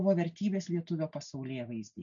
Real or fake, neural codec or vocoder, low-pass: real; none; 7.2 kHz